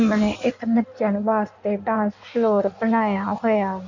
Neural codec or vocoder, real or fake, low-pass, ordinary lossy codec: codec, 16 kHz in and 24 kHz out, 1.1 kbps, FireRedTTS-2 codec; fake; 7.2 kHz; none